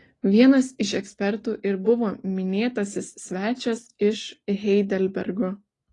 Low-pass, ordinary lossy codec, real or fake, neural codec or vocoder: 10.8 kHz; AAC, 32 kbps; real; none